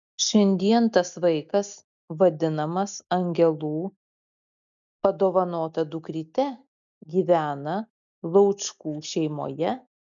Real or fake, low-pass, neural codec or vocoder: real; 7.2 kHz; none